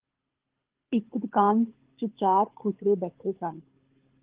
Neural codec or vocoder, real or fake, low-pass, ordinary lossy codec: codec, 24 kHz, 6 kbps, HILCodec; fake; 3.6 kHz; Opus, 16 kbps